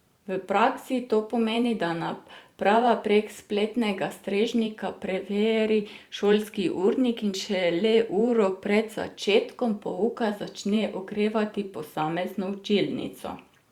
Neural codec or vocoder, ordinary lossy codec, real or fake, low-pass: vocoder, 44.1 kHz, 128 mel bands every 512 samples, BigVGAN v2; Opus, 64 kbps; fake; 19.8 kHz